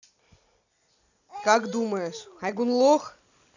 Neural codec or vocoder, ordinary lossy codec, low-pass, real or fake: none; none; 7.2 kHz; real